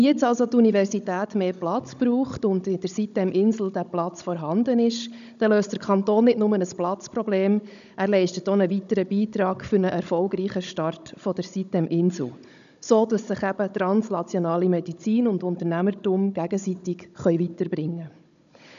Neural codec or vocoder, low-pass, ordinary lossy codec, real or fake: codec, 16 kHz, 16 kbps, FunCodec, trained on Chinese and English, 50 frames a second; 7.2 kHz; none; fake